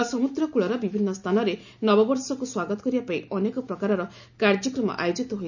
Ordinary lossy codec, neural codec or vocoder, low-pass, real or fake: none; none; 7.2 kHz; real